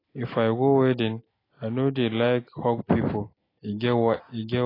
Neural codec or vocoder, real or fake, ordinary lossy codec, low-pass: none; real; AAC, 24 kbps; 5.4 kHz